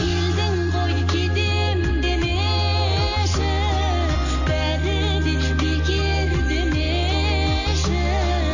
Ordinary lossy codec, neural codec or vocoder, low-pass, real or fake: none; none; 7.2 kHz; real